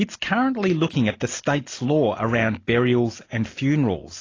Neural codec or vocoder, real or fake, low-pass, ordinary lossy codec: none; real; 7.2 kHz; AAC, 32 kbps